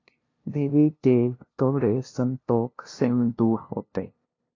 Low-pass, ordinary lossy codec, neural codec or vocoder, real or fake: 7.2 kHz; AAC, 32 kbps; codec, 16 kHz, 0.5 kbps, FunCodec, trained on LibriTTS, 25 frames a second; fake